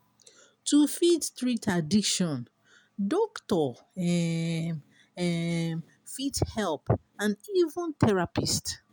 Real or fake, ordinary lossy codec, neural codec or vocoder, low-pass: real; none; none; none